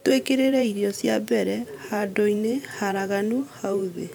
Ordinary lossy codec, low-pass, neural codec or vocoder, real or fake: none; none; vocoder, 44.1 kHz, 128 mel bands every 512 samples, BigVGAN v2; fake